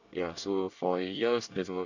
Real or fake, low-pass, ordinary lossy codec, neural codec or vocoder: fake; 7.2 kHz; none; codec, 24 kHz, 1 kbps, SNAC